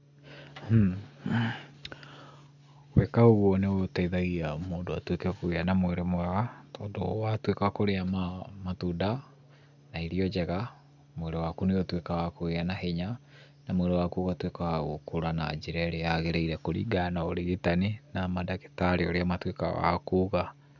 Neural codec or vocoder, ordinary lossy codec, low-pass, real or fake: none; none; 7.2 kHz; real